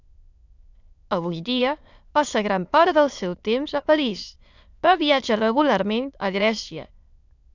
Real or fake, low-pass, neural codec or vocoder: fake; 7.2 kHz; autoencoder, 22.05 kHz, a latent of 192 numbers a frame, VITS, trained on many speakers